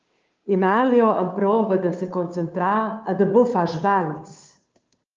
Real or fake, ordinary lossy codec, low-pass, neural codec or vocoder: fake; Opus, 24 kbps; 7.2 kHz; codec, 16 kHz, 2 kbps, FunCodec, trained on Chinese and English, 25 frames a second